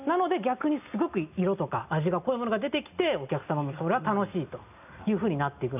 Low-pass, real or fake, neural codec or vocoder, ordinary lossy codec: 3.6 kHz; real; none; none